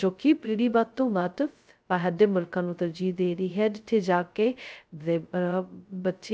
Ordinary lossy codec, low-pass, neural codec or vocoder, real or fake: none; none; codec, 16 kHz, 0.2 kbps, FocalCodec; fake